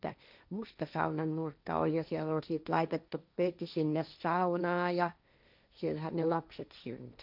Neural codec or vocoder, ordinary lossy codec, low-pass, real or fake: codec, 16 kHz, 1.1 kbps, Voila-Tokenizer; none; 5.4 kHz; fake